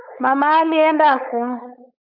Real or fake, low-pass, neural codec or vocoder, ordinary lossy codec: fake; 5.4 kHz; codec, 16 kHz, 4.8 kbps, FACodec; AAC, 48 kbps